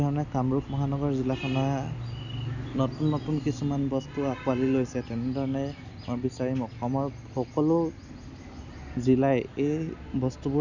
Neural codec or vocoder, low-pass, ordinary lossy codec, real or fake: none; 7.2 kHz; none; real